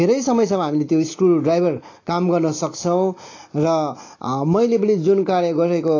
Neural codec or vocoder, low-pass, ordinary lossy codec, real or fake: none; 7.2 kHz; AAC, 32 kbps; real